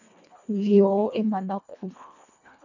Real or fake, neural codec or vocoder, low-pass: fake; codec, 24 kHz, 1.5 kbps, HILCodec; 7.2 kHz